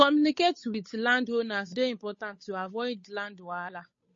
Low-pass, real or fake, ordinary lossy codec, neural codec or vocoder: 7.2 kHz; fake; MP3, 32 kbps; codec, 16 kHz, 8 kbps, FunCodec, trained on Chinese and English, 25 frames a second